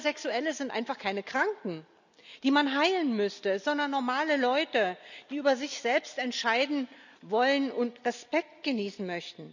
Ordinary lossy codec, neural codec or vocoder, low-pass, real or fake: none; none; 7.2 kHz; real